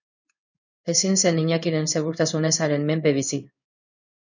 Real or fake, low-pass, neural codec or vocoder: fake; 7.2 kHz; codec, 16 kHz in and 24 kHz out, 1 kbps, XY-Tokenizer